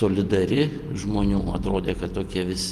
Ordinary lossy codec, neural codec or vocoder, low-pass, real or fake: Opus, 32 kbps; vocoder, 48 kHz, 128 mel bands, Vocos; 14.4 kHz; fake